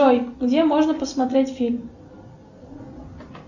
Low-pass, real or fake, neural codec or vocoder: 7.2 kHz; real; none